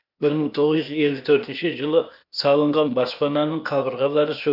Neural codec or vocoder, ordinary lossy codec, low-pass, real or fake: codec, 16 kHz, 0.8 kbps, ZipCodec; none; 5.4 kHz; fake